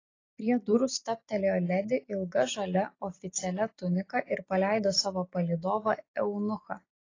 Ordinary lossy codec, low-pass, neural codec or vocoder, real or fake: AAC, 32 kbps; 7.2 kHz; none; real